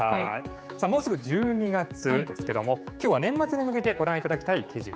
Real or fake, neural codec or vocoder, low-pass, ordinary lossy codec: fake; codec, 16 kHz, 4 kbps, X-Codec, HuBERT features, trained on general audio; none; none